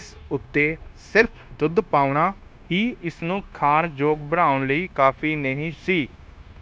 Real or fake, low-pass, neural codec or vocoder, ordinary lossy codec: fake; none; codec, 16 kHz, 0.9 kbps, LongCat-Audio-Codec; none